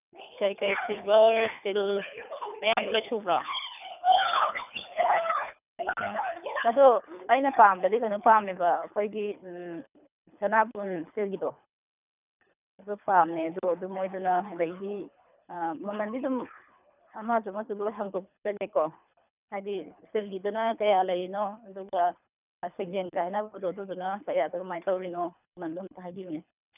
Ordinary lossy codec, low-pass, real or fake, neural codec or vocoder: none; 3.6 kHz; fake; codec, 24 kHz, 3 kbps, HILCodec